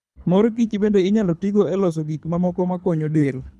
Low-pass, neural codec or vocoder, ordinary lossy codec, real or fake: none; codec, 24 kHz, 3 kbps, HILCodec; none; fake